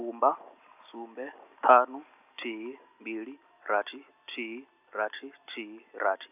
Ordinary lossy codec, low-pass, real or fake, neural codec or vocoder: none; 3.6 kHz; real; none